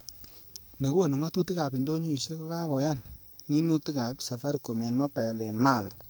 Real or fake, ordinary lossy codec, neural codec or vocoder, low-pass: fake; none; codec, 44.1 kHz, 2.6 kbps, SNAC; none